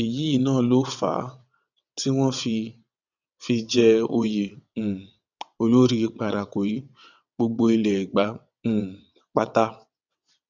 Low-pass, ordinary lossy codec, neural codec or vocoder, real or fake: 7.2 kHz; none; vocoder, 22.05 kHz, 80 mel bands, WaveNeXt; fake